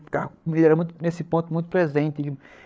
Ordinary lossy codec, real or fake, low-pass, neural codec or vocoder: none; fake; none; codec, 16 kHz, 8 kbps, FreqCodec, larger model